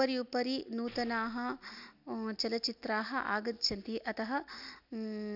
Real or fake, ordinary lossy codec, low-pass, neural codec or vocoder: real; none; 5.4 kHz; none